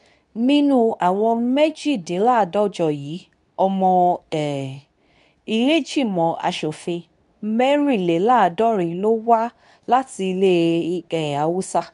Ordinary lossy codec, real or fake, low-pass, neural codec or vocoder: none; fake; 10.8 kHz; codec, 24 kHz, 0.9 kbps, WavTokenizer, medium speech release version 2